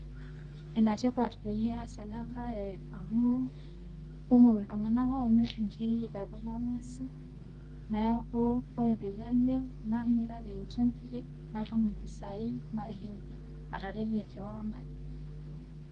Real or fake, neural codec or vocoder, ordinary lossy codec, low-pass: fake; codec, 24 kHz, 0.9 kbps, WavTokenizer, medium music audio release; Opus, 24 kbps; 10.8 kHz